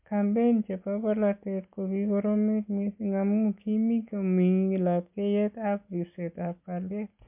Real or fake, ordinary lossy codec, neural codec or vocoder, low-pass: real; MP3, 32 kbps; none; 3.6 kHz